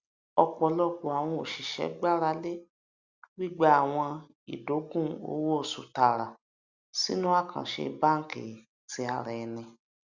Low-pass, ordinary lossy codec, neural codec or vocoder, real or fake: 7.2 kHz; Opus, 64 kbps; none; real